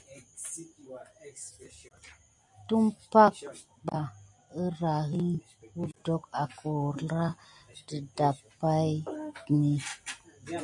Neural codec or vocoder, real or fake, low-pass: none; real; 10.8 kHz